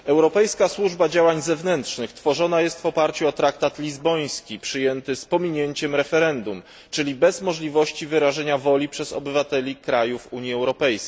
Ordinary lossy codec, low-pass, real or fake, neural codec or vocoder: none; none; real; none